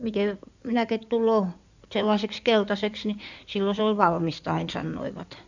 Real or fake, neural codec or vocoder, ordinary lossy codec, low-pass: fake; codec, 16 kHz in and 24 kHz out, 2.2 kbps, FireRedTTS-2 codec; none; 7.2 kHz